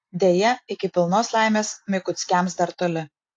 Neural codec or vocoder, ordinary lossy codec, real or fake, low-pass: none; AAC, 64 kbps; real; 9.9 kHz